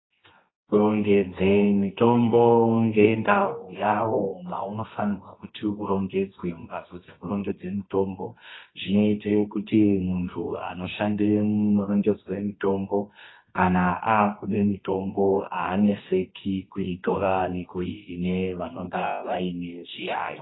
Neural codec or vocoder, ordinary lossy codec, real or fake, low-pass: codec, 24 kHz, 0.9 kbps, WavTokenizer, medium music audio release; AAC, 16 kbps; fake; 7.2 kHz